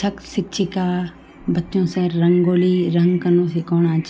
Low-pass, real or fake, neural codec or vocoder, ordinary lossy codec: none; real; none; none